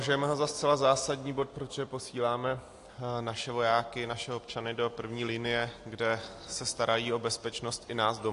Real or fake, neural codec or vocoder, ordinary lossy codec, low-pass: real; none; AAC, 48 kbps; 10.8 kHz